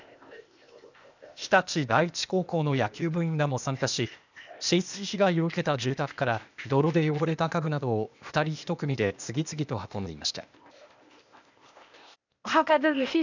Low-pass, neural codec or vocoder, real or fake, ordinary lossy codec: 7.2 kHz; codec, 16 kHz, 0.8 kbps, ZipCodec; fake; none